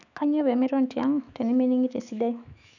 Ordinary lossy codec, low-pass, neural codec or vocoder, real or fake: none; 7.2 kHz; autoencoder, 48 kHz, 128 numbers a frame, DAC-VAE, trained on Japanese speech; fake